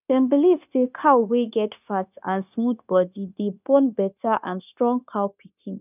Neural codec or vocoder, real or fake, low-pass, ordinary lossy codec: codec, 16 kHz, 0.9 kbps, LongCat-Audio-Codec; fake; 3.6 kHz; none